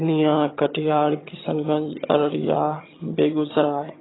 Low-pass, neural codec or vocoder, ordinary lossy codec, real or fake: 7.2 kHz; vocoder, 22.05 kHz, 80 mel bands, HiFi-GAN; AAC, 16 kbps; fake